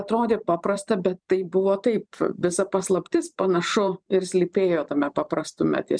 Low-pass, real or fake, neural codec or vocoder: 9.9 kHz; fake; vocoder, 44.1 kHz, 128 mel bands every 512 samples, BigVGAN v2